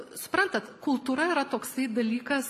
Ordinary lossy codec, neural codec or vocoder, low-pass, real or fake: AAC, 96 kbps; none; 14.4 kHz; real